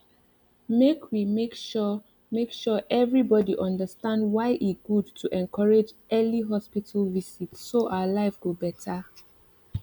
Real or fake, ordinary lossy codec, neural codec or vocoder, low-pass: real; none; none; 19.8 kHz